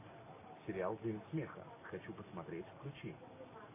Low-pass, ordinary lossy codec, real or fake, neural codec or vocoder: 3.6 kHz; MP3, 16 kbps; real; none